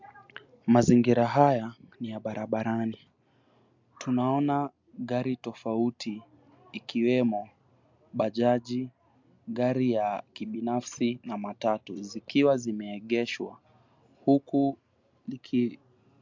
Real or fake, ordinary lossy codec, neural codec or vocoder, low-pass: real; MP3, 64 kbps; none; 7.2 kHz